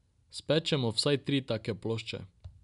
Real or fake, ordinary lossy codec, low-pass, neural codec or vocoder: real; none; 10.8 kHz; none